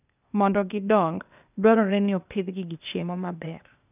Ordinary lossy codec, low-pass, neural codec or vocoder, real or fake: none; 3.6 kHz; codec, 16 kHz, 0.8 kbps, ZipCodec; fake